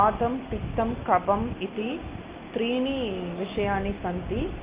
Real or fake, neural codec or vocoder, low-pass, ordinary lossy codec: real; none; 3.6 kHz; Opus, 32 kbps